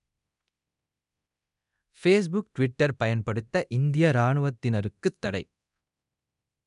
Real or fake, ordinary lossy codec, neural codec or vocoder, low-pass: fake; none; codec, 24 kHz, 0.9 kbps, DualCodec; 10.8 kHz